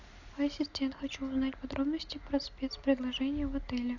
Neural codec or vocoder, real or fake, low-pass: none; real; 7.2 kHz